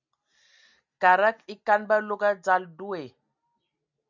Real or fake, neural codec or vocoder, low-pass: real; none; 7.2 kHz